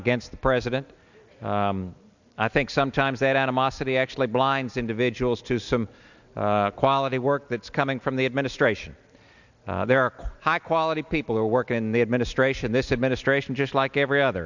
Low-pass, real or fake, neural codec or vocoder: 7.2 kHz; real; none